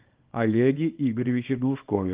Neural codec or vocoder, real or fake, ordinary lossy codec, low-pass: codec, 24 kHz, 0.9 kbps, WavTokenizer, small release; fake; Opus, 32 kbps; 3.6 kHz